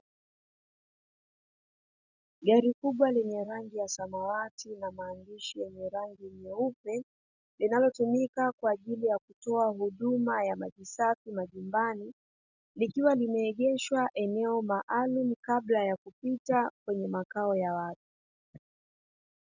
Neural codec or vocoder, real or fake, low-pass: none; real; 7.2 kHz